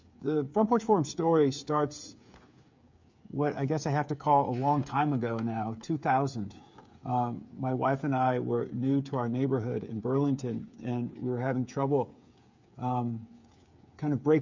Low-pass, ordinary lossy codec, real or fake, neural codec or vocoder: 7.2 kHz; MP3, 64 kbps; fake; codec, 16 kHz, 8 kbps, FreqCodec, smaller model